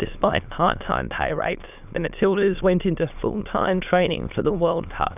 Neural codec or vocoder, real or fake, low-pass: autoencoder, 22.05 kHz, a latent of 192 numbers a frame, VITS, trained on many speakers; fake; 3.6 kHz